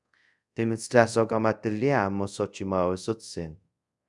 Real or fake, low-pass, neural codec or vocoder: fake; 10.8 kHz; codec, 24 kHz, 0.5 kbps, DualCodec